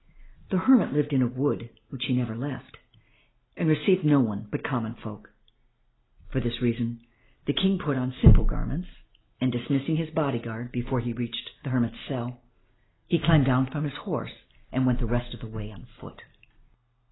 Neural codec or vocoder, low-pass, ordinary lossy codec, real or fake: none; 7.2 kHz; AAC, 16 kbps; real